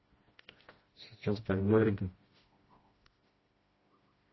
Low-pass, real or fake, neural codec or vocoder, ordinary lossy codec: 7.2 kHz; fake; codec, 16 kHz, 1 kbps, FreqCodec, smaller model; MP3, 24 kbps